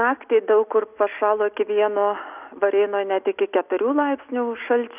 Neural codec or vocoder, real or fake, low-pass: none; real; 3.6 kHz